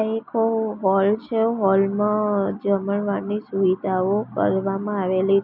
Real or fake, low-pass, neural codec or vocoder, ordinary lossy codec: real; 5.4 kHz; none; none